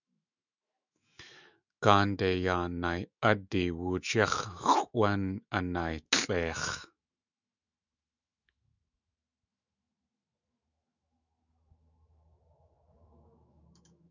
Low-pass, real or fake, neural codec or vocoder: 7.2 kHz; fake; autoencoder, 48 kHz, 128 numbers a frame, DAC-VAE, trained on Japanese speech